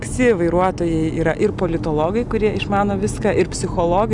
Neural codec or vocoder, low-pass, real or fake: none; 10.8 kHz; real